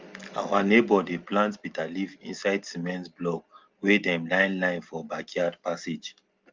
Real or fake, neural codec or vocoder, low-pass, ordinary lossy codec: real; none; 7.2 kHz; Opus, 32 kbps